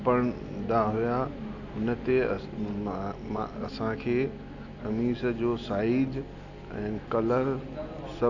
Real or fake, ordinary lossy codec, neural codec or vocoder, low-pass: real; none; none; 7.2 kHz